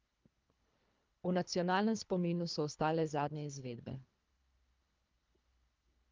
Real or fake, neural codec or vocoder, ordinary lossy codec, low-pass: fake; codec, 24 kHz, 3 kbps, HILCodec; Opus, 32 kbps; 7.2 kHz